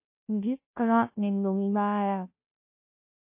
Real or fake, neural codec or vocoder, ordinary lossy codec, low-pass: fake; codec, 16 kHz, 0.5 kbps, FunCodec, trained on Chinese and English, 25 frames a second; MP3, 24 kbps; 3.6 kHz